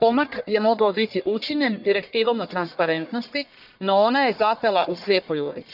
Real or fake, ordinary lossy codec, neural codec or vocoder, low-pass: fake; none; codec, 44.1 kHz, 1.7 kbps, Pupu-Codec; 5.4 kHz